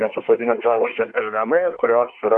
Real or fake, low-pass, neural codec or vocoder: fake; 10.8 kHz; codec, 24 kHz, 1 kbps, SNAC